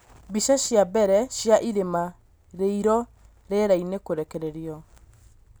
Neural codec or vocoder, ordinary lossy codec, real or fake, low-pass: none; none; real; none